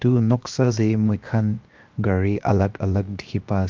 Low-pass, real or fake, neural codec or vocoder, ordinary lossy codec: 7.2 kHz; fake; codec, 16 kHz, 0.7 kbps, FocalCodec; Opus, 24 kbps